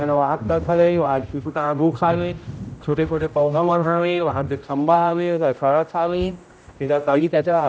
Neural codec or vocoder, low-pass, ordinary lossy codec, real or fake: codec, 16 kHz, 0.5 kbps, X-Codec, HuBERT features, trained on general audio; none; none; fake